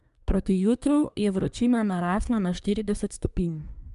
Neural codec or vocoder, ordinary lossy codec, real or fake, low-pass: codec, 24 kHz, 1 kbps, SNAC; MP3, 96 kbps; fake; 10.8 kHz